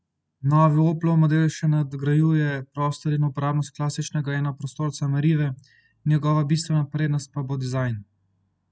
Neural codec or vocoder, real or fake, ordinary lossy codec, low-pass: none; real; none; none